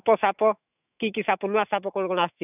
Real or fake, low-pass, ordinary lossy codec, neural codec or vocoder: fake; 3.6 kHz; none; autoencoder, 48 kHz, 128 numbers a frame, DAC-VAE, trained on Japanese speech